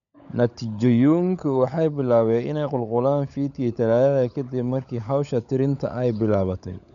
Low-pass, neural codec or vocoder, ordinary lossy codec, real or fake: 7.2 kHz; codec, 16 kHz, 16 kbps, FunCodec, trained on LibriTTS, 50 frames a second; none; fake